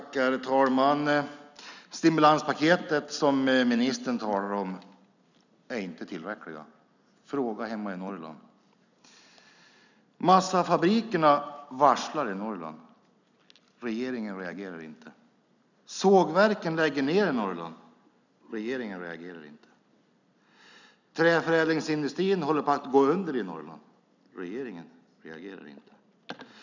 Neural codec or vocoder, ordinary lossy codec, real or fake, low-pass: none; none; real; 7.2 kHz